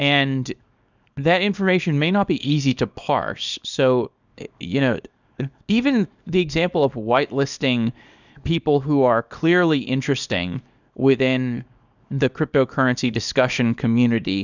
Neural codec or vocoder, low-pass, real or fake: codec, 24 kHz, 0.9 kbps, WavTokenizer, small release; 7.2 kHz; fake